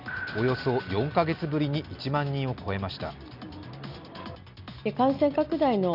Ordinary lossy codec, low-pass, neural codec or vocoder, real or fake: none; 5.4 kHz; none; real